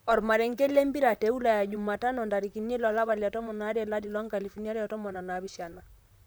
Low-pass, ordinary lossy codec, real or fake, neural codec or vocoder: none; none; fake; vocoder, 44.1 kHz, 128 mel bands, Pupu-Vocoder